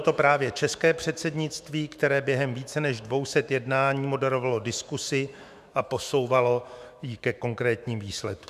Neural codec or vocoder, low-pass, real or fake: autoencoder, 48 kHz, 128 numbers a frame, DAC-VAE, trained on Japanese speech; 14.4 kHz; fake